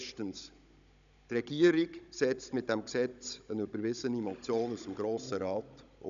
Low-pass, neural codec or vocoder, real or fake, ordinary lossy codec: 7.2 kHz; codec, 16 kHz, 16 kbps, FunCodec, trained on Chinese and English, 50 frames a second; fake; none